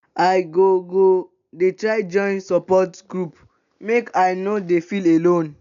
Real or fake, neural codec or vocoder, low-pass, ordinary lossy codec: real; none; 7.2 kHz; none